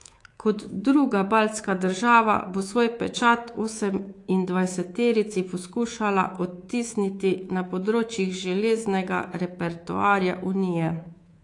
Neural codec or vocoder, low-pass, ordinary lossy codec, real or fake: codec, 24 kHz, 3.1 kbps, DualCodec; 10.8 kHz; AAC, 48 kbps; fake